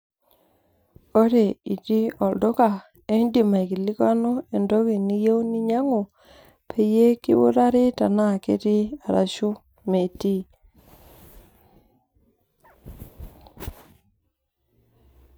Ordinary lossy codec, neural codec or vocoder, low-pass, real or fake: none; none; none; real